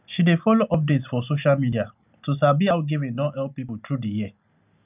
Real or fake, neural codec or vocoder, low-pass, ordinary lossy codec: real; none; 3.6 kHz; none